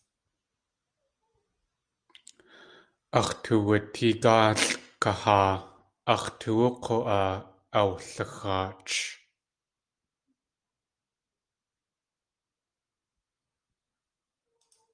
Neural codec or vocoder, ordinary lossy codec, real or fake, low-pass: none; Opus, 32 kbps; real; 9.9 kHz